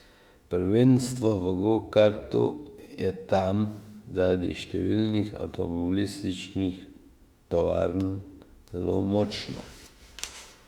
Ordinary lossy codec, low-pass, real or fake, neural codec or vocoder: Opus, 64 kbps; 19.8 kHz; fake; autoencoder, 48 kHz, 32 numbers a frame, DAC-VAE, trained on Japanese speech